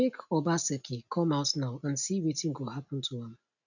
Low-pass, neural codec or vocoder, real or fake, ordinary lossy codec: 7.2 kHz; none; real; none